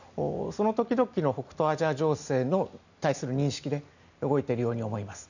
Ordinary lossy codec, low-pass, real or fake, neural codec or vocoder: none; 7.2 kHz; real; none